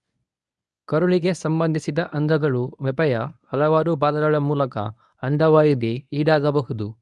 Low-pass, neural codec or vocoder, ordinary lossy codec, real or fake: 10.8 kHz; codec, 24 kHz, 0.9 kbps, WavTokenizer, medium speech release version 1; none; fake